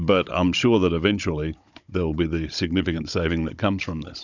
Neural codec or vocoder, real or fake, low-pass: codec, 16 kHz, 16 kbps, FunCodec, trained on Chinese and English, 50 frames a second; fake; 7.2 kHz